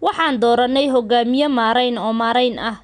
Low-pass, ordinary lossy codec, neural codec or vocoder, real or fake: 10.8 kHz; none; none; real